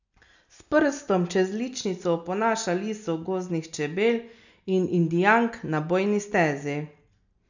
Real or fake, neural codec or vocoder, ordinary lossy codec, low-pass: real; none; none; 7.2 kHz